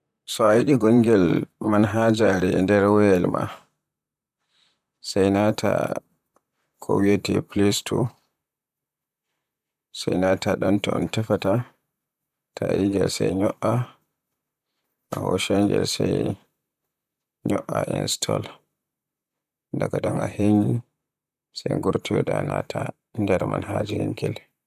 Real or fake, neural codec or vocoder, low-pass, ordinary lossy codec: fake; vocoder, 44.1 kHz, 128 mel bands, Pupu-Vocoder; 14.4 kHz; none